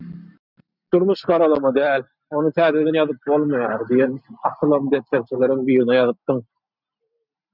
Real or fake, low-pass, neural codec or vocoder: real; 5.4 kHz; none